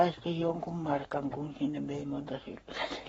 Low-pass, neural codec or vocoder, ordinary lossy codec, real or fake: 19.8 kHz; codec, 44.1 kHz, 7.8 kbps, Pupu-Codec; AAC, 24 kbps; fake